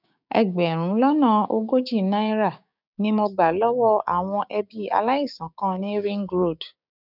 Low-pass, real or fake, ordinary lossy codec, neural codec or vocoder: 5.4 kHz; fake; none; codec, 16 kHz, 6 kbps, DAC